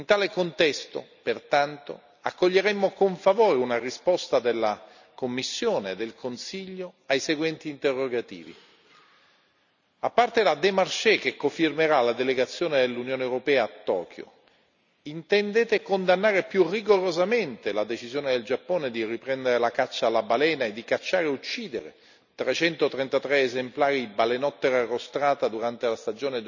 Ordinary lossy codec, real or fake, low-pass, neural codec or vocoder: none; real; 7.2 kHz; none